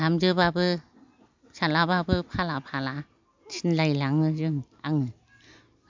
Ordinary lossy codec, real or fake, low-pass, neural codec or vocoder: MP3, 64 kbps; real; 7.2 kHz; none